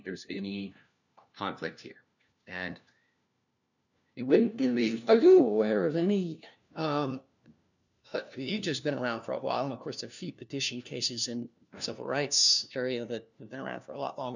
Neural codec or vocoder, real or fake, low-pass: codec, 16 kHz, 1 kbps, FunCodec, trained on LibriTTS, 50 frames a second; fake; 7.2 kHz